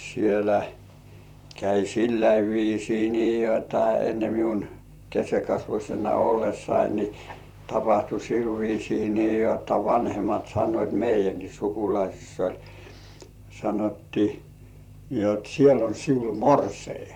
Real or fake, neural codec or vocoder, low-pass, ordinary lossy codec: fake; vocoder, 44.1 kHz, 128 mel bands, Pupu-Vocoder; 19.8 kHz; none